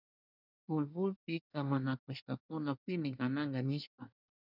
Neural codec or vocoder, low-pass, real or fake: codec, 24 kHz, 1 kbps, SNAC; 5.4 kHz; fake